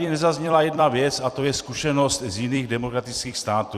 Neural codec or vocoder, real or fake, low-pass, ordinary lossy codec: vocoder, 44.1 kHz, 128 mel bands every 256 samples, BigVGAN v2; fake; 14.4 kHz; Opus, 64 kbps